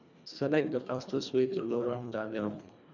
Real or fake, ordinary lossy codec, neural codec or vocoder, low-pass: fake; none; codec, 24 kHz, 1.5 kbps, HILCodec; 7.2 kHz